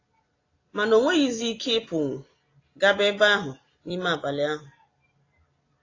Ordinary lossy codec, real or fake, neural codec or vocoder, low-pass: AAC, 32 kbps; real; none; 7.2 kHz